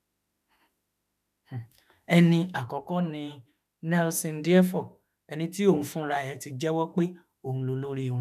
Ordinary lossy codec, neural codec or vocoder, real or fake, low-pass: none; autoencoder, 48 kHz, 32 numbers a frame, DAC-VAE, trained on Japanese speech; fake; 14.4 kHz